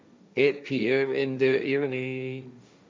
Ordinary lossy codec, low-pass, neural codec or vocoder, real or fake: none; none; codec, 16 kHz, 1.1 kbps, Voila-Tokenizer; fake